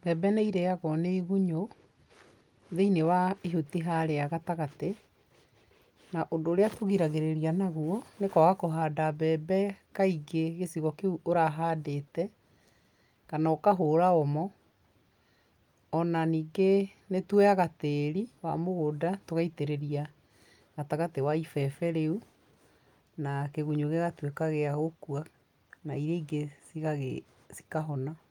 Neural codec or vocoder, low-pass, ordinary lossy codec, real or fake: none; 19.8 kHz; Opus, 32 kbps; real